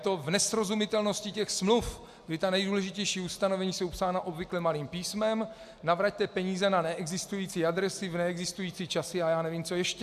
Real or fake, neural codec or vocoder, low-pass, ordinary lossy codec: real; none; 14.4 kHz; AAC, 96 kbps